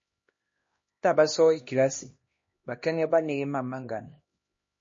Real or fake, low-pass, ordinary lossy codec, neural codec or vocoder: fake; 7.2 kHz; MP3, 32 kbps; codec, 16 kHz, 1 kbps, X-Codec, HuBERT features, trained on LibriSpeech